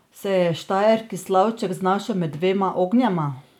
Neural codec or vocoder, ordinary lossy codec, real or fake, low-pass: none; none; real; 19.8 kHz